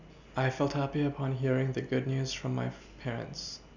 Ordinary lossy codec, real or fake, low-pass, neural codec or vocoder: none; real; 7.2 kHz; none